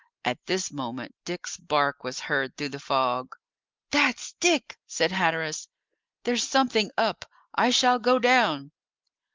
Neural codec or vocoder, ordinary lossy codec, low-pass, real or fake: none; Opus, 32 kbps; 7.2 kHz; real